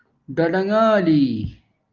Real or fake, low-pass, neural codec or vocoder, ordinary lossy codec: real; 7.2 kHz; none; Opus, 24 kbps